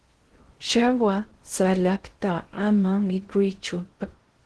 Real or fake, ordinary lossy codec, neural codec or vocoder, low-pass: fake; Opus, 16 kbps; codec, 16 kHz in and 24 kHz out, 0.6 kbps, FocalCodec, streaming, 2048 codes; 10.8 kHz